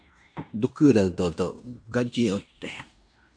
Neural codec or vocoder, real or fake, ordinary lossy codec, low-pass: codec, 16 kHz in and 24 kHz out, 0.9 kbps, LongCat-Audio-Codec, fine tuned four codebook decoder; fake; AAC, 64 kbps; 9.9 kHz